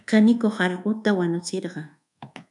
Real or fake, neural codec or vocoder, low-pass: fake; codec, 24 kHz, 1.2 kbps, DualCodec; 10.8 kHz